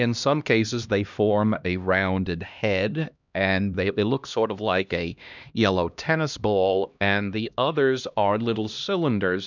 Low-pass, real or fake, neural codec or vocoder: 7.2 kHz; fake; codec, 16 kHz, 1 kbps, X-Codec, HuBERT features, trained on LibriSpeech